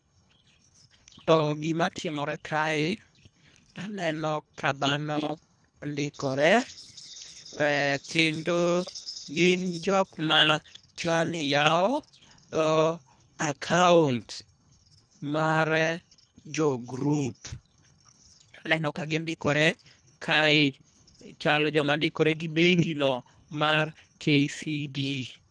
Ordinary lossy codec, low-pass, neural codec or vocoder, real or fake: none; 9.9 kHz; codec, 24 kHz, 1.5 kbps, HILCodec; fake